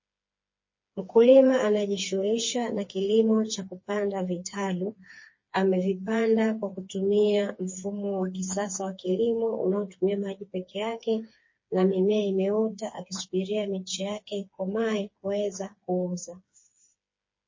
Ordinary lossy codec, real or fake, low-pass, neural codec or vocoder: MP3, 32 kbps; fake; 7.2 kHz; codec, 16 kHz, 4 kbps, FreqCodec, smaller model